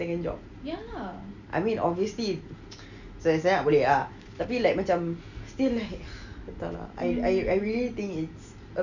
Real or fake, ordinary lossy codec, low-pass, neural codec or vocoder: real; none; 7.2 kHz; none